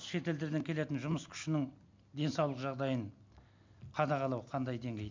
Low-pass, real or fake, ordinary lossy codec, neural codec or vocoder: 7.2 kHz; real; MP3, 64 kbps; none